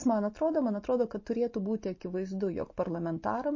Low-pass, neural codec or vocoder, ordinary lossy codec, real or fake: 7.2 kHz; none; MP3, 32 kbps; real